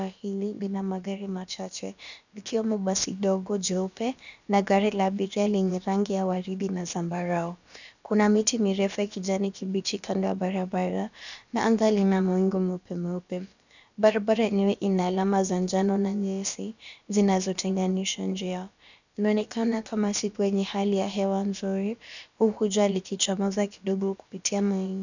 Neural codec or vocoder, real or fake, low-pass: codec, 16 kHz, about 1 kbps, DyCAST, with the encoder's durations; fake; 7.2 kHz